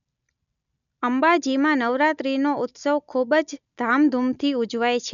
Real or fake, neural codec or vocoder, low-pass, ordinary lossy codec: real; none; 7.2 kHz; none